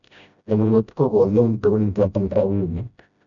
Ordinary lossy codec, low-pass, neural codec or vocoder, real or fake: none; 7.2 kHz; codec, 16 kHz, 0.5 kbps, FreqCodec, smaller model; fake